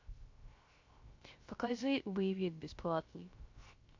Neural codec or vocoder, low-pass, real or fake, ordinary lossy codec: codec, 16 kHz, 0.3 kbps, FocalCodec; 7.2 kHz; fake; MP3, 48 kbps